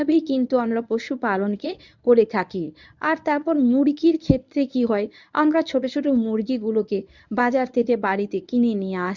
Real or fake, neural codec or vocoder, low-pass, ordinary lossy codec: fake; codec, 24 kHz, 0.9 kbps, WavTokenizer, medium speech release version 1; 7.2 kHz; none